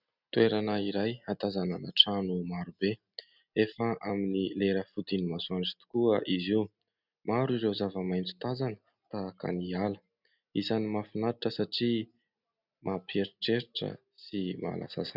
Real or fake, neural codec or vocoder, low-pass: real; none; 5.4 kHz